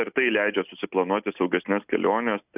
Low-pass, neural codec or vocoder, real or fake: 3.6 kHz; none; real